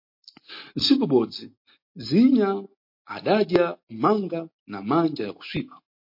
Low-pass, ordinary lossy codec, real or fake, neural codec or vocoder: 5.4 kHz; MP3, 32 kbps; real; none